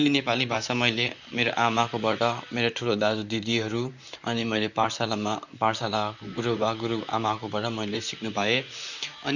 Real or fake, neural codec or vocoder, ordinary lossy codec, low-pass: fake; vocoder, 44.1 kHz, 128 mel bands, Pupu-Vocoder; none; 7.2 kHz